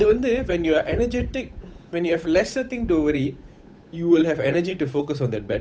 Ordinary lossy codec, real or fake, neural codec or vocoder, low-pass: none; fake; codec, 16 kHz, 8 kbps, FunCodec, trained on Chinese and English, 25 frames a second; none